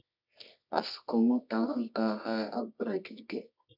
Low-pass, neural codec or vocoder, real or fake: 5.4 kHz; codec, 24 kHz, 0.9 kbps, WavTokenizer, medium music audio release; fake